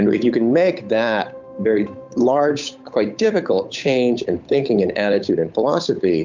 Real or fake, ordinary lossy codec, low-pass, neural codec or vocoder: fake; MP3, 64 kbps; 7.2 kHz; codec, 16 kHz, 8 kbps, FunCodec, trained on Chinese and English, 25 frames a second